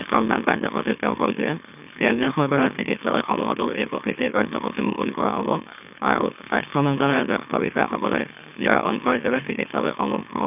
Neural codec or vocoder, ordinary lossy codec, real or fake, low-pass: autoencoder, 44.1 kHz, a latent of 192 numbers a frame, MeloTTS; none; fake; 3.6 kHz